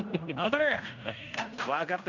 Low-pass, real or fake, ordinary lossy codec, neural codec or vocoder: 7.2 kHz; fake; none; codec, 16 kHz in and 24 kHz out, 0.9 kbps, LongCat-Audio-Codec, fine tuned four codebook decoder